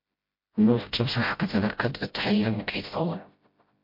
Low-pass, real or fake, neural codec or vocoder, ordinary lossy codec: 5.4 kHz; fake; codec, 16 kHz, 0.5 kbps, FreqCodec, smaller model; MP3, 32 kbps